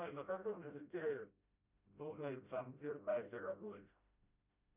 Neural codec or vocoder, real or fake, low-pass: codec, 16 kHz, 0.5 kbps, FreqCodec, smaller model; fake; 3.6 kHz